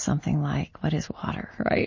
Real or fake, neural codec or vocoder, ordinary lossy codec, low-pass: real; none; MP3, 32 kbps; 7.2 kHz